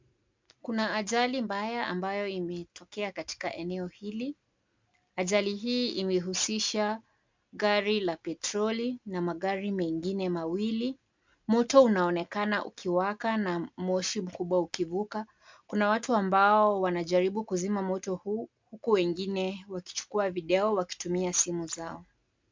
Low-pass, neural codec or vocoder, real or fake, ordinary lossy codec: 7.2 kHz; none; real; MP3, 64 kbps